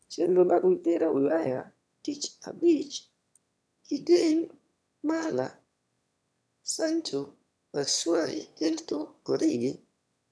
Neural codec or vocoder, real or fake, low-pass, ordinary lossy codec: autoencoder, 22.05 kHz, a latent of 192 numbers a frame, VITS, trained on one speaker; fake; none; none